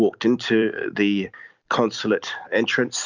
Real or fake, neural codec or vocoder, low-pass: fake; vocoder, 44.1 kHz, 128 mel bands every 256 samples, BigVGAN v2; 7.2 kHz